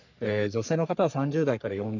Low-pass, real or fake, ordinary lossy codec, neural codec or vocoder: 7.2 kHz; fake; none; codec, 44.1 kHz, 3.4 kbps, Pupu-Codec